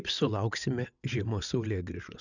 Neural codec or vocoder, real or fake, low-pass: vocoder, 22.05 kHz, 80 mel bands, WaveNeXt; fake; 7.2 kHz